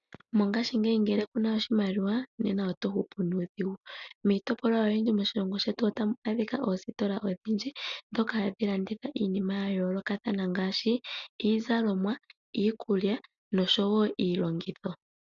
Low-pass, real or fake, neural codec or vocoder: 7.2 kHz; real; none